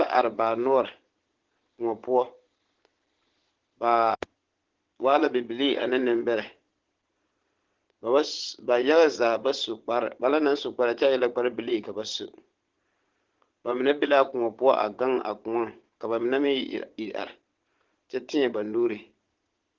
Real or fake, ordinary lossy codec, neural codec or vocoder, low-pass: fake; Opus, 16 kbps; vocoder, 22.05 kHz, 80 mel bands, Vocos; 7.2 kHz